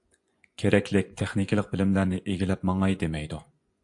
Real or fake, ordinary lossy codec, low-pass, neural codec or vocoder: fake; AAC, 64 kbps; 10.8 kHz; vocoder, 24 kHz, 100 mel bands, Vocos